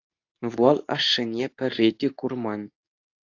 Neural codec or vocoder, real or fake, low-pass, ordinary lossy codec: codec, 24 kHz, 0.9 kbps, WavTokenizer, medium speech release version 2; fake; 7.2 kHz; AAC, 48 kbps